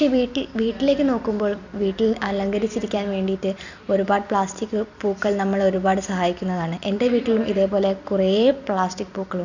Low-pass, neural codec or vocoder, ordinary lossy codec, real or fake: 7.2 kHz; vocoder, 44.1 kHz, 128 mel bands every 256 samples, BigVGAN v2; none; fake